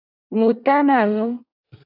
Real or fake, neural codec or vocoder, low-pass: fake; codec, 24 kHz, 1 kbps, SNAC; 5.4 kHz